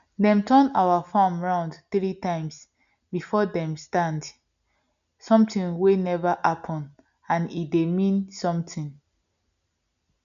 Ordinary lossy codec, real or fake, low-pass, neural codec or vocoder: none; real; 7.2 kHz; none